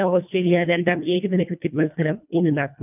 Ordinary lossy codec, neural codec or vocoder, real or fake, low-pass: none; codec, 24 kHz, 1.5 kbps, HILCodec; fake; 3.6 kHz